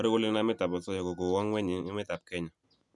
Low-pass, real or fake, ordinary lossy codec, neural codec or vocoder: 10.8 kHz; real; none; none